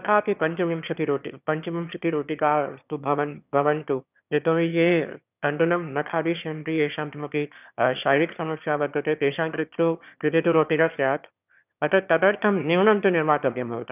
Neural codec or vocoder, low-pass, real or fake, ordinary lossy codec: autoencoder, 22.05 kHz, a latent of 192 numbers a frame, VITS, trained on one speaker; 3.6 kHz; fake; none